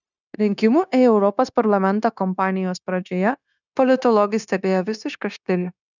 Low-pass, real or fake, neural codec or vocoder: 7.2 kHz; fake; codec, 16 kHz, 0.9 kbps, LongCat-Audio-Codec